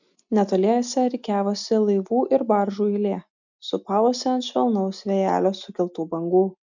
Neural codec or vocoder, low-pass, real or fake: none; 7.2 kHz; real